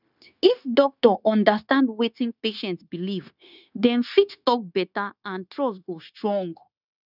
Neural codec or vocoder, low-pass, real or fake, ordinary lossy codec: codec, 16 kHz, 0.9 kbps, LongCat-Audio-Codec; 5.4 kHz; fake; none